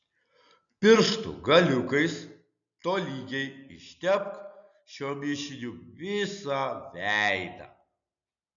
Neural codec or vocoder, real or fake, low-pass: none; real; 7.2 kHz